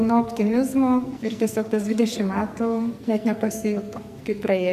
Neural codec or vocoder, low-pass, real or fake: codec, 44.1 kHz, 2.6 kbps, SNAC; 14.4 kHz; fake